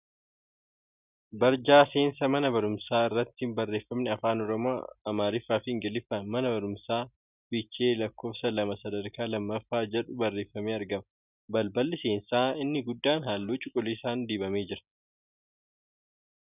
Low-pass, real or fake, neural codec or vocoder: 3.6 kHz; real; none